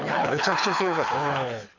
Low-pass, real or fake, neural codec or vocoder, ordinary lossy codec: 7.2 kHz; fake; codec, 24 kHz, 6 kbps, HILCodec; none